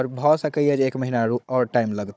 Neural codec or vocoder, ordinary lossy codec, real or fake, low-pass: codec, 16 kHz, 16 kbps, FunCodec, trained on Chinese and English, 50 frames a second; none; fake; none